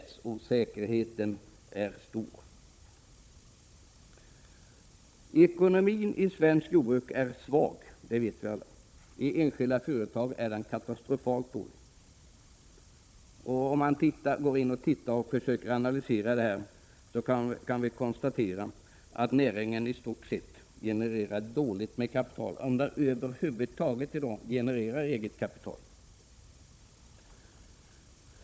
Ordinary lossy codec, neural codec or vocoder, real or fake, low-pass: none; codec, 16 kHz, 16 kbps, FunCodec, trained on Chinese and English, 50 frames a second; fake; none